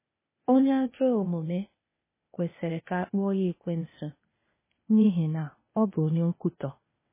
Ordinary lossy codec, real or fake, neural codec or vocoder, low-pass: MP3, 16 kbps; fake; codec, 16 kHz, 0.8 kbps, ZipCodec; 3.6 kHz